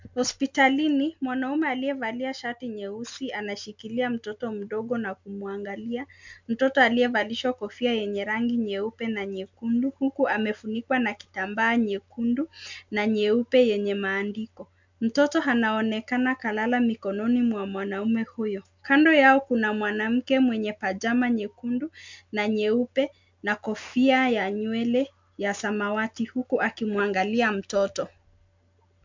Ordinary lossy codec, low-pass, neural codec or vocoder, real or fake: MP3, 64 kbps; 7.2 kHz; none; real